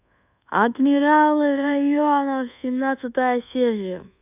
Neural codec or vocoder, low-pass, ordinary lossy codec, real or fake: codec, 24 kHz, 1.2 kbps, DualCodec; 3.6 kHz; none; fake